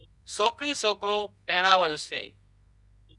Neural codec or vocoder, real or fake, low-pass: codec, 24 kHz, 0.9 kbps, WavTokenizer, medium music audio release; fake; 10.8 kHz